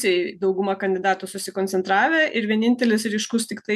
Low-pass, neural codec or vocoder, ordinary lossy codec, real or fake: 14.4 kHz; none; MP3, 96 kbps; real